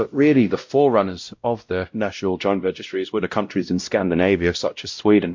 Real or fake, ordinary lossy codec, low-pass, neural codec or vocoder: fake; MP3, 48 kbps; 7.2 kHz; codec, 16 kHz, 0.5 kbps, X-Codec, WavLM features, trained on Multilingual LibriSpeech